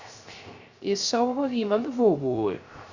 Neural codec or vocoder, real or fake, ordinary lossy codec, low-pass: codec, 16 kHz, 0.3 kbps, FocalCodec; fake; Opus, 64 kbps; 7.2 kHz